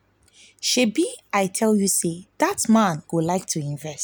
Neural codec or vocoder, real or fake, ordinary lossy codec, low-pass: none; real; none; none